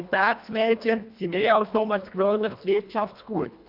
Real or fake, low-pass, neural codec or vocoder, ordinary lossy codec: fake; 5.4 kHz; codec, 24 kHz, 1.5 kbps, HILCodec; none